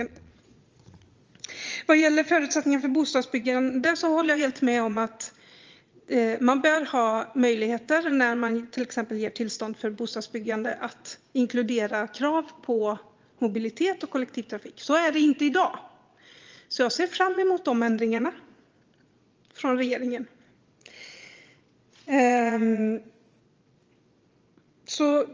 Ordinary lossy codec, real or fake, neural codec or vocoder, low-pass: Opus, 32 kbps; fake; vocoder, 22.05 kHz, 80 mel bands, Vocos; 7.2 kHz